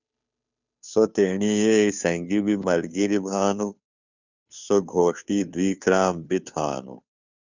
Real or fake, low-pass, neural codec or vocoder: fake; 7.2 kHz; codec, 16 kHz, 2 kbps, FunCodec, trained on Chinese and English, 25 frames a second